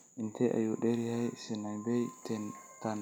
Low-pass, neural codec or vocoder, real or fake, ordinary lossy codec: none; none; real; none